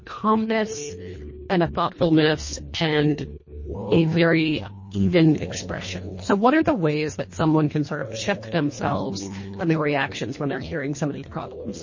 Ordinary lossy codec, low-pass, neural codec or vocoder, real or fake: MP3, 32 kbps; 7.2 kHz; codec, 24 kHz, 1.5 kbps, HILCodec; fake